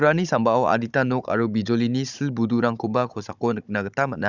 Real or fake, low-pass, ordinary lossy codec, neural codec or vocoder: fake; 7.2 kHz; none; codec, 16 kHz, 16 kbps, FunCodec, trained on Chinese and English, 50 frames a second